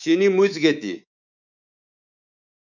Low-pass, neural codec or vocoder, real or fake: 7.2 kHz; codec, 24 kHz, 3.1 kbps, DualCodec; fake